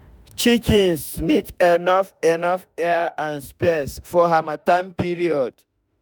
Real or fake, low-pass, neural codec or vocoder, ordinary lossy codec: fake; none; autoencoder, 48 kHz, 32 numbers a frame, DAC-VAE, trained on Japanese speech; none